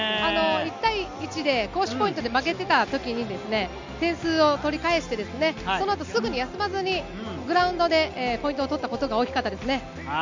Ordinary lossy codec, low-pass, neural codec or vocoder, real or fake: MP3, 64 kbps; 7.2 kHz; none; real